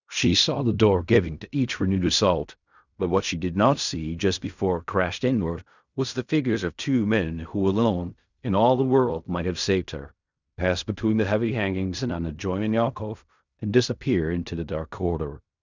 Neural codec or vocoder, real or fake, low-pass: codec, 16 kHz in and 24 kHz out, 0.4 kbps, LongCat-Audio-Codec, fine tuned four codebook decoder; fake; 7.2 kHz